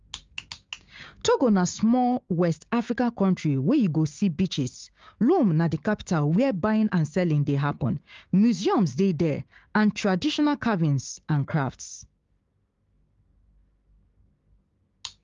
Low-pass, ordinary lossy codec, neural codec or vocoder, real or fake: 7.2 kHz; Opus, 32 kbps; codec, 16 kHz, 6 kbps, DAC; fake